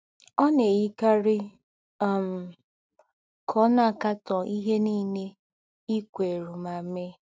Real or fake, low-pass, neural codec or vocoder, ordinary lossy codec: real; none; none; none